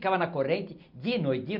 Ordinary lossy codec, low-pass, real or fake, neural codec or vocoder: none; 5.4 kHz; real; none